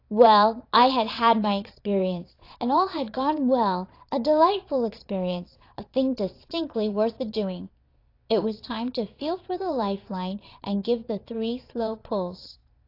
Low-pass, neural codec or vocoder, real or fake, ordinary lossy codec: 5.4 kHz; vocoder, 22.05 kHz, 80 mel bands, Vocos; fake; AAC, 32 kbps